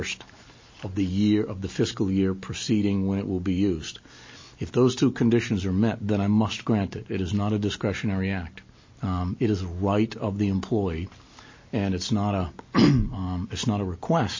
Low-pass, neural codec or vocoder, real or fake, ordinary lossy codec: 7.2 kHz; none; real; MP3, 32 kbps